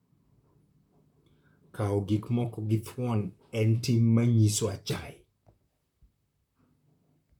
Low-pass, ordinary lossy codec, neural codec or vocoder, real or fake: 19.8 kHz; none; vocoder, 44.1 kHz, 128 mel bands, Pupu-Vocoder; fake